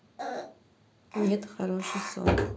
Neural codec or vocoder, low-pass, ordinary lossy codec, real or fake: none; none; none; real